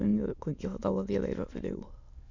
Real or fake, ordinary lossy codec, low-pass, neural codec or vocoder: fake; none; 7.2 kHz; autoencoder, 22.05 kHz, a latent of 192 numbers a frame, VITS, trained on many speakers